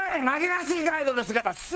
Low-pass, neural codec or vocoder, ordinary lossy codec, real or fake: none; codec, 16 kHz, 4 kbps, FunCodec, trained on LibriTTS, 50 frames a second; none; fake